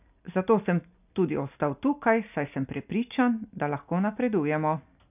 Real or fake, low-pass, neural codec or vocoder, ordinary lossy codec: real; 3.6 kHz; none; none